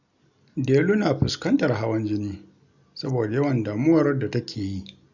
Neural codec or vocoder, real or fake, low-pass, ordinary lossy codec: none; real; 7.2 kHz; none